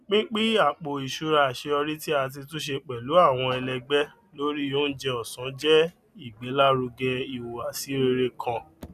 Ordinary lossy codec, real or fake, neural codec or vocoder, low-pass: none; real; none; 14.4 kHz